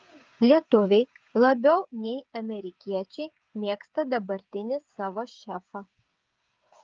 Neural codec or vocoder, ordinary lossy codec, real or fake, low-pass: codec, 16 kHz, 8 kbps, FreqCodec, smaller model; Opus, 24 kbps; fake; 7.2 kHz